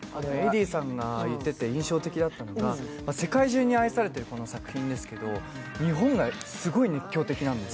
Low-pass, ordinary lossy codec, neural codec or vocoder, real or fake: none; none; none; real